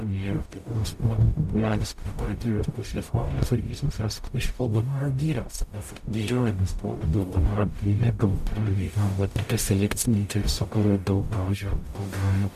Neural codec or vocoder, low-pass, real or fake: codec, 44.1 kHz, 0.9 kbps, DAC; 14.4 kHz; fake